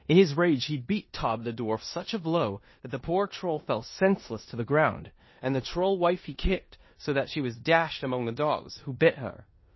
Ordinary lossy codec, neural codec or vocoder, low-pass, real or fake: MP3, 24 kbps; codec, 16 kHz in and 24 kHz out, 0.9 kbps, LongCat-Audio-Codec, four codebook decoder; 7.2 kHz; fake